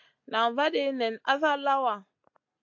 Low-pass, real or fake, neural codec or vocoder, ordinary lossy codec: 7.2 kHz; real; none; AAC, 48 kbps